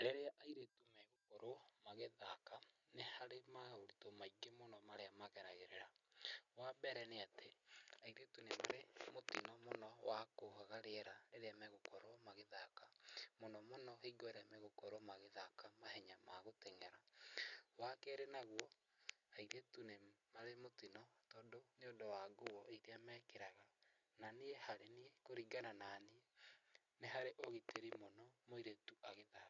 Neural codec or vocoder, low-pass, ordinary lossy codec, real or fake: none; 7.2 kHz; none; real